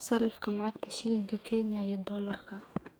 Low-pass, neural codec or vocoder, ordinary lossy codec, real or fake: none; codec, 44.1 kHz, 2.6 kbps, DAC; none; fake